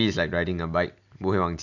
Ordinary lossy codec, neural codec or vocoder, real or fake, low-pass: none; none; real; 7.2 kHz